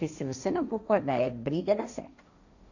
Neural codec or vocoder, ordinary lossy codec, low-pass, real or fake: codec, 16 kHz, 1.1 kbps, Voila-Tokenizer; none; 7.2 kHz; fake